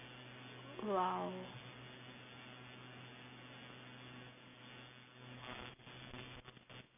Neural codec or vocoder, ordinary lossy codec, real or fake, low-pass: none; none; real; 3.6 kHz